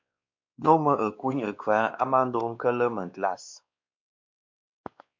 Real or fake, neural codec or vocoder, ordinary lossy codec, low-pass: fake; codec, 16 kHz, 2 kbps, X-Codec, WavLM features, trained on Multilingual LibriSpeech; MP3, 64 kbps; 7.2 kHz